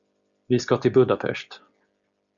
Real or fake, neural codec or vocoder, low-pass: real; none; 7.2 kHz